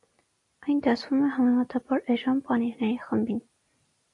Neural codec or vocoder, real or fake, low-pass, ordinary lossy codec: none; real; 10.8 kHz; AAC, 48 kbps